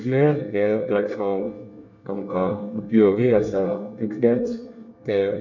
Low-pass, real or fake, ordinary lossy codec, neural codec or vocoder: 7.2 kHz; fake; none; codec, 24 kHz, 1 kbps, SNAC